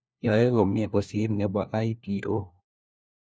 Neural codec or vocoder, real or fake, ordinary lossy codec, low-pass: codec, 16 kHz, 1 kbps, FunCodec, trained on LibriTTS, 50 frames a second; fake; none; none